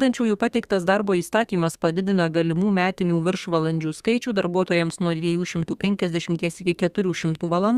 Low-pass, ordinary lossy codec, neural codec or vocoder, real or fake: 14.4 kHz; Opus, 64 kbps; codec, 32 kHz, 1.9 kbps, SNAC; fake